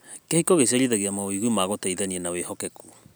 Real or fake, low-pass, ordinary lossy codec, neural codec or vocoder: real; none; none; none